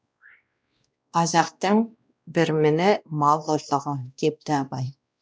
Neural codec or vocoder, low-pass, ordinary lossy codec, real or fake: codec, 16 kHz, 1 kbps, X-Codec, WavLM features, trained on Multilingual LibriSpeech; none; none; fake